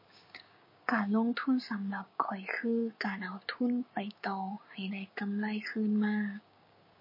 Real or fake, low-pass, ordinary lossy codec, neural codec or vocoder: fake; 5.4 kHz; MP3, 24 kbps; codec, 16 kHz, 6 kbps, DAC